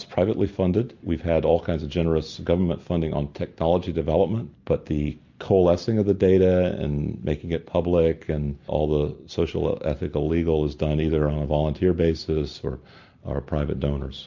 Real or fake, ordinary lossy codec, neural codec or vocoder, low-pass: real; MP3, 48 kbps; none; 7.2 kHz